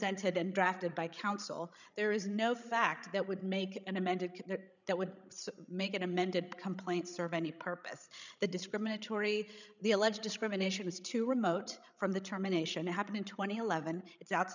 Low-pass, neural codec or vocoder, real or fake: 7.2 kHz; codec, 16 kHz, 16 kbps, FreqCodec, larger model; fake